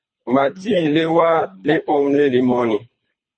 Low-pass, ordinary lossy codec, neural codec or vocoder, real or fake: 9.9 kHz; MP3, 32 kbps; codec, 24 kHz, 3 kbps, HILCodec; fake